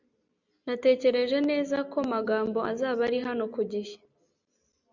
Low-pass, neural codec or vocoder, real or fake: 7.2 kHz; none; real